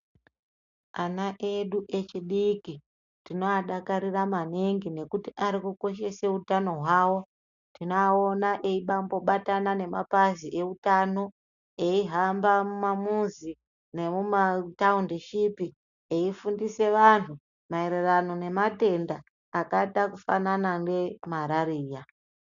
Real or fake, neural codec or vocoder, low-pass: real; none; 7.2 kHz